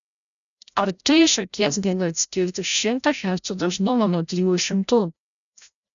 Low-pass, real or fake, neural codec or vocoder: 7.2 kHz; fake; codec, 16 kHz, 0.5 kbps, FreqCodec, larger model